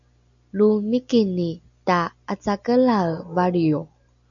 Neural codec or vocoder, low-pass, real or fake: none; 7.2 kHz; real